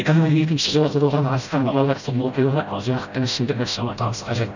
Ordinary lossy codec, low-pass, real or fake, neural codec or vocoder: none; 7.2 kHz; fake; codec, 16 kHz, 0.5 kbps, FreqCodec, smaller model